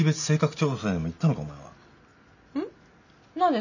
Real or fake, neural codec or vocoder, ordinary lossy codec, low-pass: real; none; none; 7.2 kHz